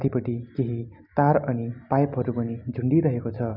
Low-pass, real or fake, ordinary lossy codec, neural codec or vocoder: 5.4 kHz; real; none; none